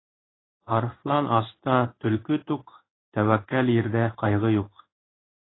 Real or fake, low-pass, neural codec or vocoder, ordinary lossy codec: fake; 7.2 kHz; codec, 16 kHz in and 24 kHz out, 1 kbps, XY-Tokenizer; AAC, 16 kbps